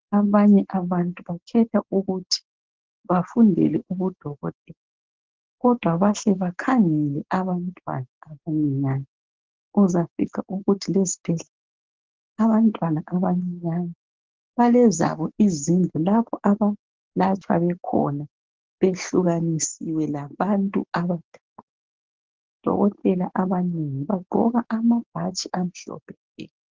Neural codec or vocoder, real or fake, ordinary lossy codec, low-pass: none; real; Opus, 16 kbps; 7.2 kHz